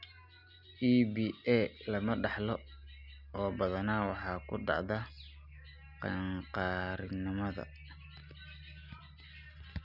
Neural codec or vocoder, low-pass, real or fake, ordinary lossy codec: none; 5.4 kHz; real; none